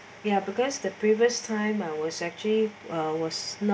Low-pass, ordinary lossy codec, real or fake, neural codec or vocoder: none; none; real; none